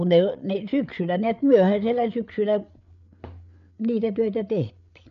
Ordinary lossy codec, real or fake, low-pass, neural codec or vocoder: none; fake; 7.2 kHz; codec, 16 kHz, 8 kbps, FreqCodec, larger model